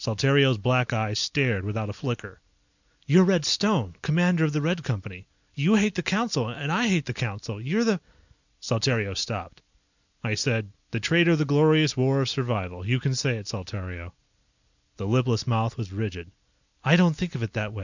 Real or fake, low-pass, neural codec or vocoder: real; 7.2 kHz; none